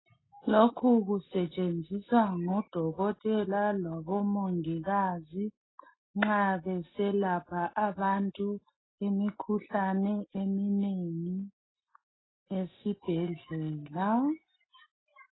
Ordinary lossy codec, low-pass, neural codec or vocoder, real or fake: AAC, 16 kbps; 7.2 kHz; none; real